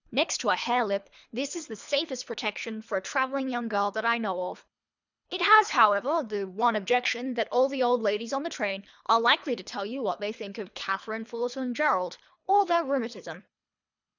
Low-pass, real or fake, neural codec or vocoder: 7.2 kHz; fake; codec, 24 kHz, 3 kbps, HILCodec